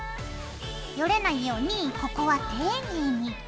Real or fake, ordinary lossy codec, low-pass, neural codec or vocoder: real; none; none; none